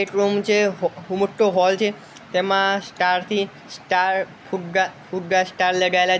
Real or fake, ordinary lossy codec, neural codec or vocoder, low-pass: real; none; none; none